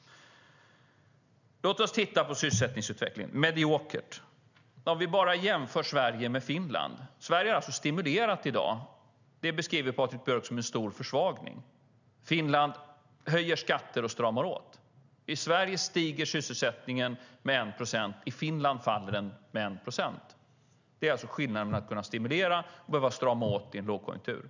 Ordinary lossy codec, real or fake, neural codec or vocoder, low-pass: none; real; none; 7.2 kHz